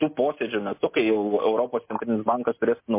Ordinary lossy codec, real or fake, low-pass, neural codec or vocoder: MP3, 24 kbps; real; 3.6 kHz; none